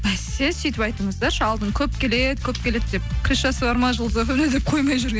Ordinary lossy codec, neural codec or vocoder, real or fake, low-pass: none; none; real; none